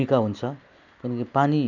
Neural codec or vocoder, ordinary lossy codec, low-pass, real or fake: none; none; 7.2 kHz; real